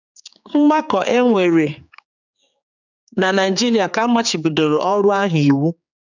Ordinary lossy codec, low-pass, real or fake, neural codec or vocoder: none; 7.2 kHz; fake; codec, 16 kHz, 4 kbps, X-Codec, HuBERT features, trained on general audio